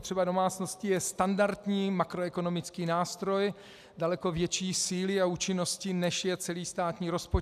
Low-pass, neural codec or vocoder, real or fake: 14.4 kHz; none; real